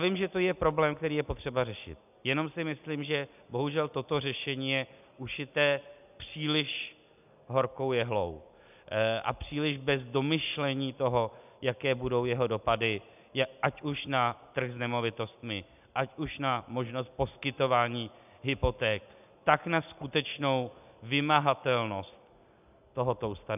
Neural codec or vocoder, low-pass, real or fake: none; 3.6 kHz; real